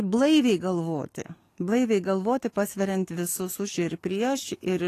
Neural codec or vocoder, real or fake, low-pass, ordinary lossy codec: codec, 44.1 kHz, 7.8 kbps, DAC; fake; 14.4 kHz; AAC, 48 kbps